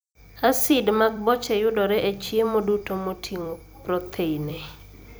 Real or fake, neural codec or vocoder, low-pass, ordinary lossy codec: fake; vocoder, 44.1 kHz, 128 mel bands every 256 samples, BigVGAN v2; none; none